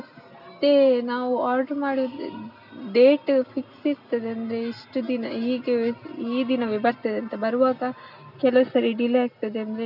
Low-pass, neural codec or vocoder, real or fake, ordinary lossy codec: 5.4 kHz; none; real; none